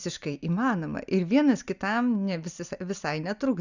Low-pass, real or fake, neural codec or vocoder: 7.2 kHz; real; none